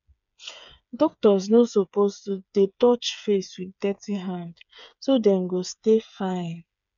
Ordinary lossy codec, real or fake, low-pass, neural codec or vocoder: none; fake; 7.2 kHz; codec, 16 kHz, 8 kbps, FreqCodec, smaller model